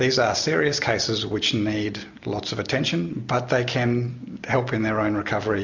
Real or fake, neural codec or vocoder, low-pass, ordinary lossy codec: real; none; 7.2 kHz; MP3, 64 kbps